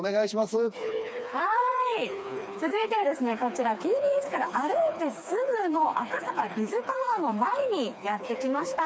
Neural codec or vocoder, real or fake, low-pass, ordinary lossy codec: codec, 16 kHz, 2 kbps, FreqCodec, smaller model; fake; none; none